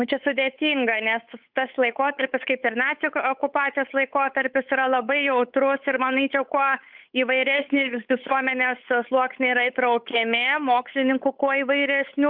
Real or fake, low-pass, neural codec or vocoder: fake; 5.4 kHz; codec, 16 kHz, 8 kbps, FunCodec, trained on Chinese and English, 25 frames a second